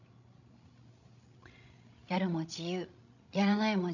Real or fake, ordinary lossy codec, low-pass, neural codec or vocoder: fake; none; 7.2 kHz; codec, 16 kHz, 16 kbps, FreqCodec, larger model